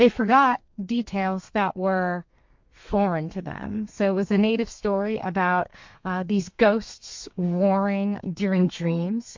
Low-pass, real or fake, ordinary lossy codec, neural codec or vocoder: 7.2 kHz; fake; MP3, 48 kbps; codec, 32 kHz, 1.9 kbps, SNAC